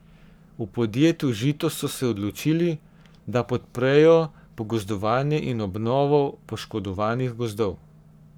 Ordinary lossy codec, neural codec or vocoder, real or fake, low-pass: none; codec, 44.1 kHz, 7.8 kbps, Pupu-Codec; fake; none